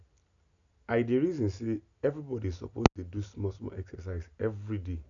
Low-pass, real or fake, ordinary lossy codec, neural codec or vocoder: 7.2 kHz; real; none; none